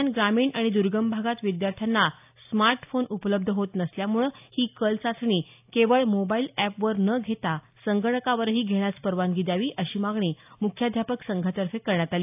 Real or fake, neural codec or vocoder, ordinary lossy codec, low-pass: real; none; AAC, 32 kbps; 3.6 kHz